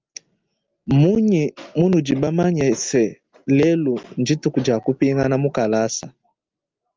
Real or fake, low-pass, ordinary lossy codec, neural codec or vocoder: real; 7.2 kHz; Opus, 24 kbps; none